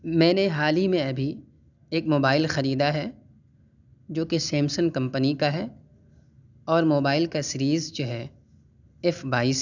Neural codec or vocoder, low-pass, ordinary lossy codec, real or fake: none; 7.2 kHz; none; real